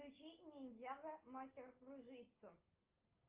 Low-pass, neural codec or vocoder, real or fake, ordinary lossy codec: 3.6 kHz; none; real; Opus, 24 kbps